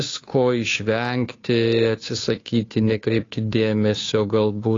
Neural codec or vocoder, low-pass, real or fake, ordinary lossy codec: codec, 16 kHz, 2 kbps, FunCodec, trained on Chinese and English, 25 frames a second; 7.2 kHz; fake; AAC, 32 kbps